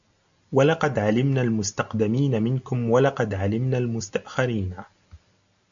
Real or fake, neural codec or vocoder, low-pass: real; none; 7.2 kHz